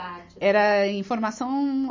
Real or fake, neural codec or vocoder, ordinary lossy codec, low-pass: real; none; MP3, 32 kbps; 7.2 kHz